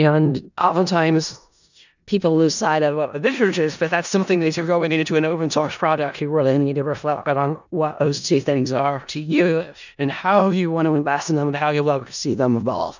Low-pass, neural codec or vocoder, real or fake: 7.2 kHz; codec, 16 kHz in and 24 kHz out, 0.4 kbps, LongCat-Audio-Codec, four codebook decoder; fake